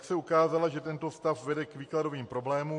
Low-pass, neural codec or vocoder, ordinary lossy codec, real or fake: 10.8 kHz; none; MP3, 48 kbps; real